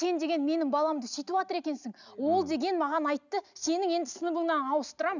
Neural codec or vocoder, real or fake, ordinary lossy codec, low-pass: none; real; none; 7.2 kHz